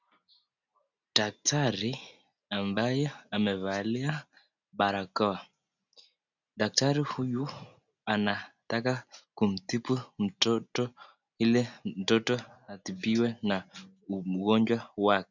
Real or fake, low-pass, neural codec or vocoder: real; 7.2 kHz; none